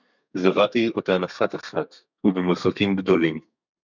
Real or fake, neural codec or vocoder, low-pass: fake; codec, 32 kHz, 1.9 kbps, SNAC; 7.2 kHz